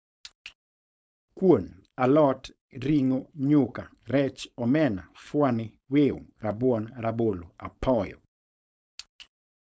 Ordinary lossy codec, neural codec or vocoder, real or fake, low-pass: none; codec, 16 kHz, 4.8 kbps, FACodec; fake; none